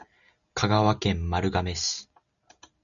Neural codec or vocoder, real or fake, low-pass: none; real; 7.2 kHz